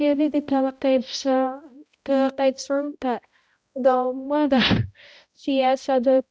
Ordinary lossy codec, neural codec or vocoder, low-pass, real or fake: none; codec, 16 kHz, 0.5 kbps, X-Codec, HuBERT features, trained on balanced general audio; none; fake